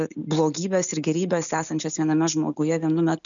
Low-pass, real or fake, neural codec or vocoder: 7.2 kHz; real; none